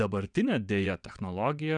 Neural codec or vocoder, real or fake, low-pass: vocoder, 22.05 kHz, 80 mel bands, WaveNeXt; fake; 9.9 kHz